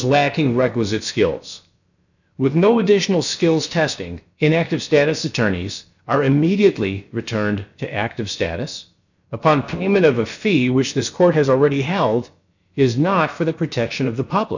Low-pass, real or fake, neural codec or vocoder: 7.2 kHz; fake; codec, 16 kHz, about 1 kbps, DyCAST, with the encoder's durations